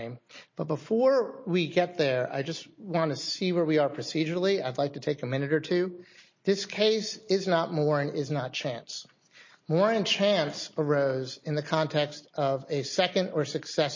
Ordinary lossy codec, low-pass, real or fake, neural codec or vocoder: MP3, 32 kbps; 7.2 kHz; real; none